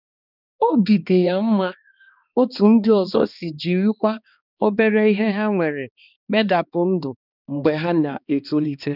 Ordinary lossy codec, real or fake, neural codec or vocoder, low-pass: none; fake; codec, 16 kHz, 2 kbps, X-Codec, HuBERT features, trained on balanced general audio; 5.4 kHz